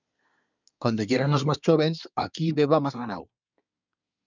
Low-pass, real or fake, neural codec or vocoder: 7.2 kHz; fake; codec, 24 kHz, 1 kbps, SNAC